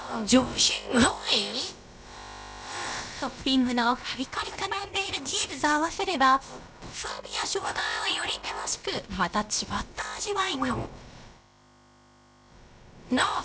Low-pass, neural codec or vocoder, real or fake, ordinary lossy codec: none; codec, 16 kHz, about 1 kbps, DyCAST, with the encoder's durations; fake; none